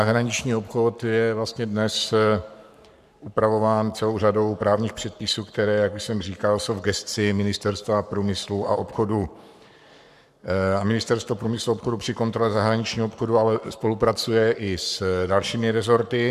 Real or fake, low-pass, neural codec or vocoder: fake; 14.4 kHz; codec, 44.1 kHz, 7.8 kbps, Pupu-Codec